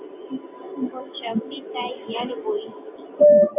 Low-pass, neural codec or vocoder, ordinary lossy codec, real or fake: 3.6 kHz; none; AAC, 32 kbps; real